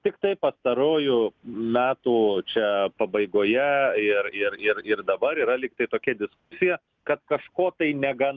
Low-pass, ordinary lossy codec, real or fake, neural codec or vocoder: 7.2 kHz; Opus, 24 kbps; real; none